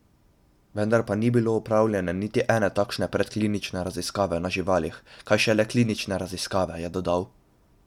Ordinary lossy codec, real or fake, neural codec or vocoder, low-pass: none; real; none; 19.8 kHz